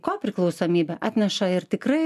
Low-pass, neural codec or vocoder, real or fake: 14.4 kHz; none; real